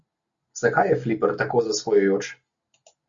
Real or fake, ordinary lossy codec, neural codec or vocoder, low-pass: real; Opus, 64 kbps; none; 7.2 kHz